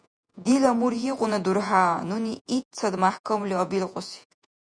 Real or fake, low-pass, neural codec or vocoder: fake; 9.9 kHz; vocoder, 48 kHz, 128 mel bands, Vocos